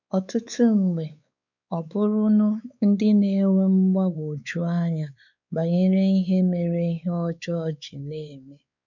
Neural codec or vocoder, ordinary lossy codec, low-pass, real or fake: codec, 16 kHz, 4 kbps, X-Codec, WavLM features, trained on Multilingual LibriSpeech; none; 7.2 kHz; fake